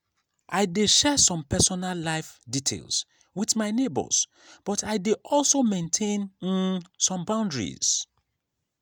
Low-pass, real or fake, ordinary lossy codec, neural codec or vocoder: none; real; none; none